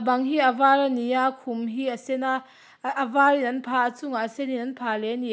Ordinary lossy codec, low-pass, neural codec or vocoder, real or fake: none; none; none; real